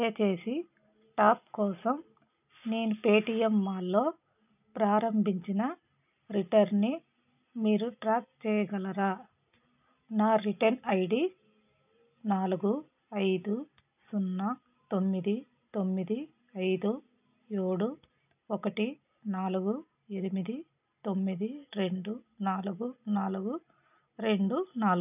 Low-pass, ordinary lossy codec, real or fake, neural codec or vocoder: 3.6 kHz; none; real; none